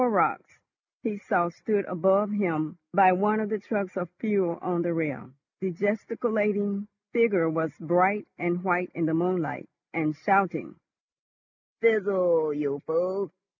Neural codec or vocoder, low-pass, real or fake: none; 7.2 kHz; real